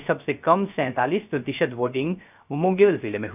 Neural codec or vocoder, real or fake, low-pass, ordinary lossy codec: codec, 16 kHz, 0.3 kbps, FocalCodec; fake; 3.6 kHz; none